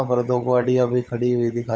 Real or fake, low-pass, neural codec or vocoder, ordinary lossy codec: fake; none; codec, 16 kHz, 16 kbps, FunCodec, trained on LibriTTS, 50 frames a second; none